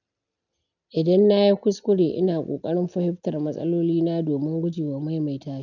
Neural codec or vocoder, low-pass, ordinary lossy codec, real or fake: none; 7.2 kHz; none; real